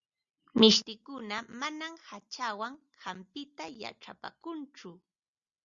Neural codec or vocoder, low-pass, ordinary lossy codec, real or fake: none; 7.2 kHz; Opus, 64 kbps; real